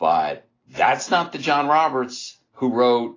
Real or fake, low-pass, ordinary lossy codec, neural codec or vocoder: real; 7.2 kHz; AAC, 32 kbps; none